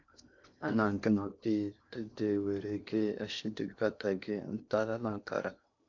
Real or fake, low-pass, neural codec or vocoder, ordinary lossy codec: fake; 7.2 kHz; codec, 16 kHz in and 24 kHz out, 0.8 kbps, FocalCodec, streaming, 65536 codes; MP3, 64 kbps